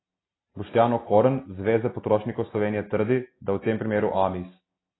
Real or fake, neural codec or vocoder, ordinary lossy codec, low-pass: real; none; AAC, 16 kbps; 7.2 kHz